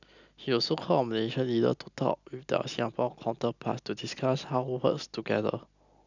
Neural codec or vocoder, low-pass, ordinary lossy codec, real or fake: none; 7.2 kHz; none; real